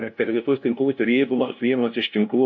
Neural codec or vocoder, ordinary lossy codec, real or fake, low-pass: codec, 16 kHz, 0.5 kbps, FunCodec, trained on LibriTTS, 25 frames a second; MP3, 48 kbps; fake; 7.2 kHz